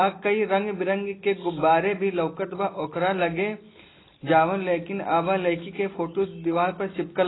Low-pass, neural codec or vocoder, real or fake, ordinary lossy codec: 7.2 kHz; none; real; AAC, 16 kbps